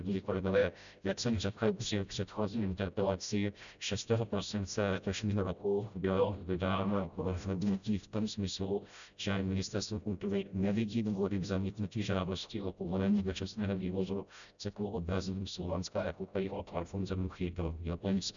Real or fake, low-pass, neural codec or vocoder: fake; 7.2 kHz; codec, 16 kHz, 0.5 kbps, FreqCodec, smaller model